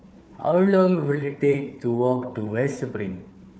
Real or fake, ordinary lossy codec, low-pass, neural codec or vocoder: fake; none; none; codec, 16 kHz, 4 kbps, FunCodec, trained on Chinese and English, 50 frames a second